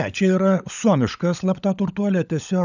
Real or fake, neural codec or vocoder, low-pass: fake; codec, 16 kHz, 16 kbps, FunCodec, trained on LibriTTS, 50 frames a second; 7.2 kHz